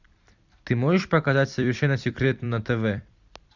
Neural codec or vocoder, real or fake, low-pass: vocoder, 44.1 kHz, 128 mel bands every 256 samples, BigVGAN v2; fake; 7.2 kHz